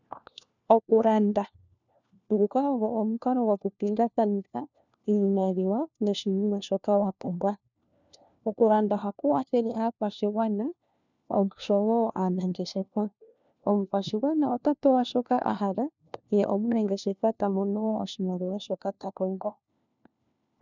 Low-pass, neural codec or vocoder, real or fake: 7.2 kHz; codec, 16 kHz, 1 kbps, FunCodec, trained on LibriTTS, 50 frames a second; fake